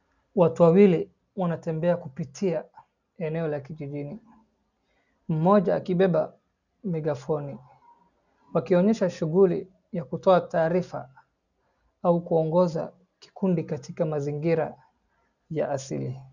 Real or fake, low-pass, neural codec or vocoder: real; 7.2 kHz; none